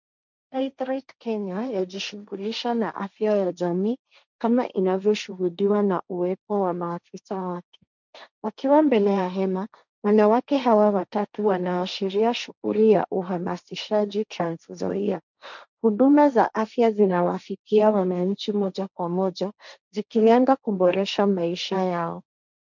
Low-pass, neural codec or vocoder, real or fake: 7.2 kHz; codec, 16 kHz, 1.1 kbps, Voila-Tokenizer; fake